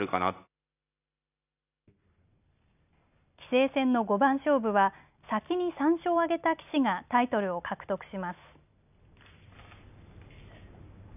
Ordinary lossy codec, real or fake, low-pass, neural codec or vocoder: none; real; 3.6 kHz; none